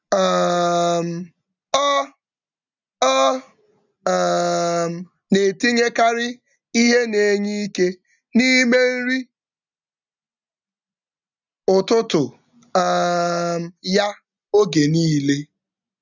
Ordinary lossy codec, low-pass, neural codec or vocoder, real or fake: none; 7.2 kHz; none; real